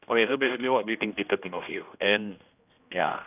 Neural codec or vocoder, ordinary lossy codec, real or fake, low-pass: codec, 16 kHz, 1 kbps, X-Codec, HuBERT features, trained on general audio; none; fake; 3.6 kHz